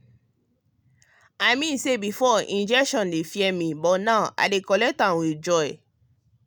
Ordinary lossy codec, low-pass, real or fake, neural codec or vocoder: none; none; real; none